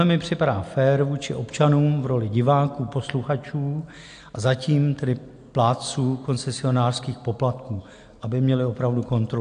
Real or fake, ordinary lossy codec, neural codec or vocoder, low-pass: real; AAC, 64 kbps; none; 9.9 kHz